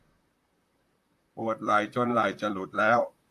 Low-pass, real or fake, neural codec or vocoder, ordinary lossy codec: 14.4 kHz; fake; vocoder, 44.1 kHz, 128 mel bands, Pupu-Vocoder; AAC, 64 kbps